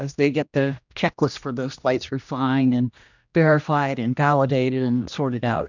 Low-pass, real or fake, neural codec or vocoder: 7.2 kHz; fake; codec, 16 kHz, 1 kbps, X-Codec, HuBERT features, trained on general audio